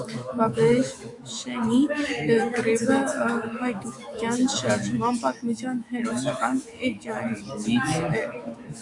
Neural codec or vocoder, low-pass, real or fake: autoencoder, 48 kHz, 128 numbers a frame, DAC-VAE, trained on Japanese speech; 10.8 kHz; fake